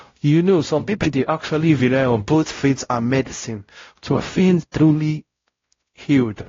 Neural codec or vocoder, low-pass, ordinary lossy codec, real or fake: codec, 16 kHz, 0.5 kbps, X-Codec, WavLM features, trained on Multilingual LibriSpeech; 7.2 kHz; AAC, 32 kbps; fake